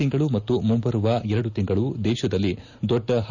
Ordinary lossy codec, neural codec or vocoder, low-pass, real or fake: none; none; 7.2 kHz; real